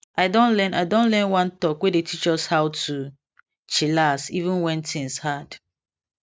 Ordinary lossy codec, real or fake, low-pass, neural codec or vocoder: none; real; none; none